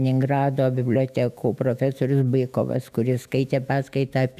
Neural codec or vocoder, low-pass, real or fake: autoencoder, 48 kHz, 128 numbers a frame, DAC-VAE, trained on Japanese speech; 14.4 kHz; fake